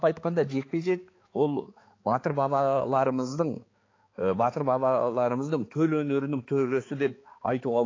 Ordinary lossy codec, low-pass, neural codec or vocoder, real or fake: AAC, 32 kbps; 7.2 kHz; codec, 16 kHz, 4 kbps, X-Codec, HuBERT features, trained on balanced general audio; fake